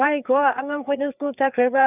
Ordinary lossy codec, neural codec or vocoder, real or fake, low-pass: none; codec, 16 kHz, 4 kbps, FreqCodec, larger model; fake; 3.6 kHz